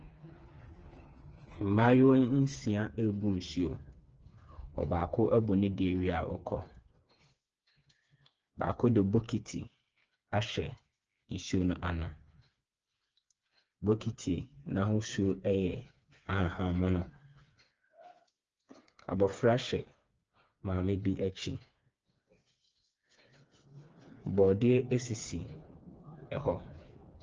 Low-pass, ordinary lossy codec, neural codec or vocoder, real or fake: 7.2 kHz; Opus, 24 kbps; codec, 16 kHz, 4 kbps, FreqCodec, smaller model; fake